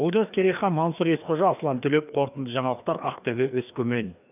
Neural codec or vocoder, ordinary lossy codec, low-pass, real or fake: codec, 16 kHz, 2 kbps, FreqCodec, larger model; none; 3.6 kHz; fake